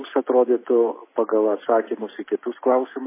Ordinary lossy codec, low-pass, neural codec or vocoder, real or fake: MP3, 16 kbps; 3.6 kHz; none; real